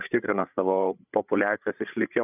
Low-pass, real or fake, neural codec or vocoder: 3.6 kHz; fake; codec, 16 kHz, 8 kbps, FreqCodec, larger model